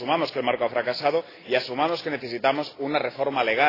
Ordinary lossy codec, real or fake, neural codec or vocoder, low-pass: AAC, 24 kbps; real; none; 5.4 kHz